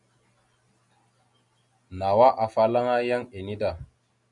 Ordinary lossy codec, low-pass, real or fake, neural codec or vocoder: MP3, 96 kbps; 10.8 kHz; real; none